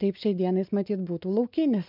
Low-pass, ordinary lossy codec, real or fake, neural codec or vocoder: 5.4 kHz; MP3, 48 kbps; real; none